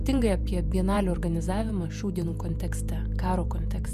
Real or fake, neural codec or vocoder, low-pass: fake; vocoder, 48 kHz, 128 mel bands, Vocos; 14.4 kHz